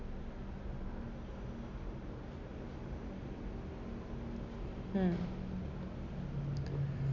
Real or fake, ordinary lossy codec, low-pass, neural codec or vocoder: real; none; 7.2 kHz; none